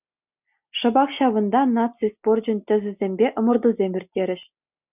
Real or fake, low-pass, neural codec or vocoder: real; 3.6 kHz; none